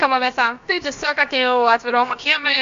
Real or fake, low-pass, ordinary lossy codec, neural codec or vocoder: fake; 7.2 kHz; AAC, 64 kbps; codec, 16 kHz, about 1 kbps, DyCAST, with the encoder's durations